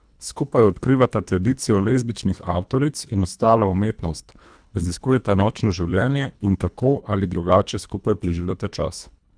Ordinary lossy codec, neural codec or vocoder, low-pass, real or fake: none; codec, 24 kHz, 1.5 kbps, HILCodec; 9.9 kHz; fake